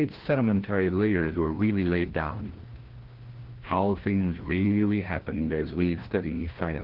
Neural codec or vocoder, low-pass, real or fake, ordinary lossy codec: codec, 16 kHz, 1 kbps, FreqCodec, larger model; 5.4 kHz; fake; Opus, 16 kbps